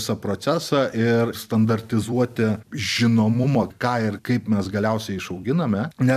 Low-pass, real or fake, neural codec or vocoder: 14.4 kHz; fake; vocoder, 44.1 kHz, 128 mel bands every 256 samples, BigVGAN v2